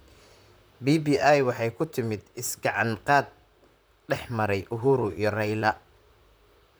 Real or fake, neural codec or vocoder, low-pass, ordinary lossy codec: fake; vocoder, 44.1 kHz, 128 mel bands, Pupu-Vocoder; none; none